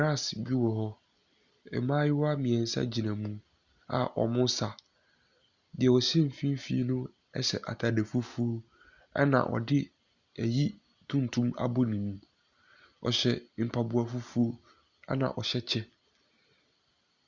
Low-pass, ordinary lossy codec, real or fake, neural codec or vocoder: 7.2 kHz; Opus, 64 kbps; real; none